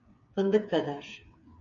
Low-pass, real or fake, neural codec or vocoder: 7.2 kHz; fake; codec, 16 kHz, 8 kbps, FreqCodec, smaller model